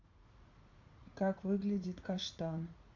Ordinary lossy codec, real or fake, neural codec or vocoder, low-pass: AAC, 48 kbps; fake; vocoder, 44.1 kHz, 80 mel bands, Vocos; 7.2 kHz